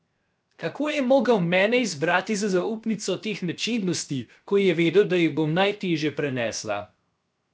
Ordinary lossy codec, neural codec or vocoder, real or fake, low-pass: none; codec, 16 kHz, 0.7 kbps, FocalCodec; fake; none